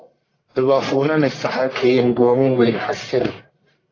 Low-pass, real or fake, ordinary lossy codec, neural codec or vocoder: 7.2 kHz; fake; AAC, 32 kbps; codec, 44.1 kHz, 1.7 kbps, Pupu-Codec